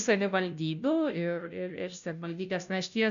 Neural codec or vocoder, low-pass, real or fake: codec, 16 kHz, 0.5 kbps, FunCodec, trained on Chinese and English, 25 frames a second; 7.2 kHz; fake